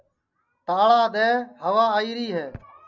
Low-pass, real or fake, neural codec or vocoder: 7.2 kHz; real; none